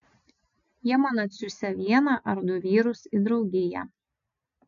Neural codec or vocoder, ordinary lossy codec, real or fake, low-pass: none; MP3, 96 kbps; real; 7.2 kHz